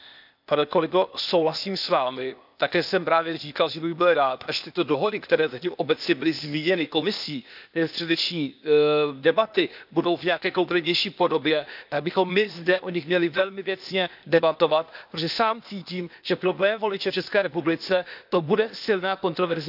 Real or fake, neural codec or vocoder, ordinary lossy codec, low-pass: fake; codec, 16 kHz, 0.8 kbps, ZipCodec; none; 5.4 kHz